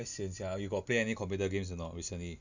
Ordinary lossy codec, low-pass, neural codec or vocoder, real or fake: none; 7.2 kHz; none; real